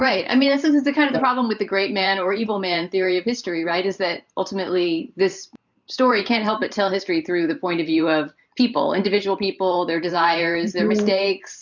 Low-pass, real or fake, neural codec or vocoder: 7.2 kHz; fake; vocoder, 44.1 kHz, 128 mel bands every 512 samples, BigVGAN v2